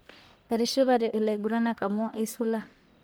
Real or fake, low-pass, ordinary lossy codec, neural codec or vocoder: fake; none; none; codec, 44.1 kHz, 1.7 kbps, Pupu-Codec